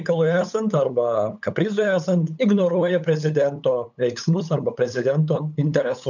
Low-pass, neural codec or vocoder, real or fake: 7.2 kHz; codec, 16 kHz, 8 kbps, FunCodec, trained on LibriTTS, 25 frames a second; fake